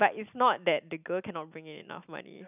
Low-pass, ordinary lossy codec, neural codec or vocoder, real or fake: 3.6 kHz; none; none; real